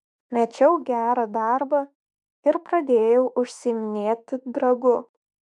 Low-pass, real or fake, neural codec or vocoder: 10.8 kHz; fake; autoencoder, 48 kHz, 32 numbers a frame, DAC-VAE, trained on Japanese speech